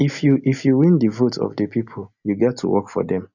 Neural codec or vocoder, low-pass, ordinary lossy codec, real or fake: none; 7.2 kHz; none; real